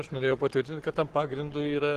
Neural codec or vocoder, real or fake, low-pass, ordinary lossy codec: codec, 44.1 kHz, 7.8 kbps, Pupu-Codec; fake; 14.4 kHz; Opus, 16 kbps